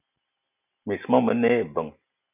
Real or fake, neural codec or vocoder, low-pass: real; none; 3.6 kHz